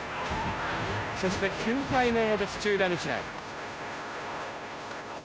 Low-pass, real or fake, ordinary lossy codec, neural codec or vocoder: none; fake; none; codec, 16 kHz, 0.5 kbps, FunCodec, trained on Chinese and English, 25 frames a second